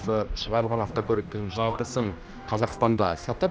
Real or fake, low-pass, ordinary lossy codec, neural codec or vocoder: fake; none; none; codec, 16 kHz, 1 kbps, X-Codec, HuBERT features, trained on general audio